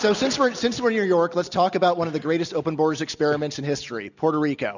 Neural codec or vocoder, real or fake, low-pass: none; real; 7.2 kHz